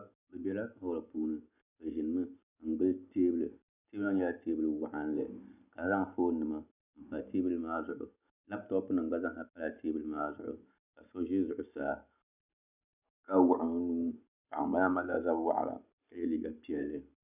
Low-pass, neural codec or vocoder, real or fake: 3.6 kHz; none; real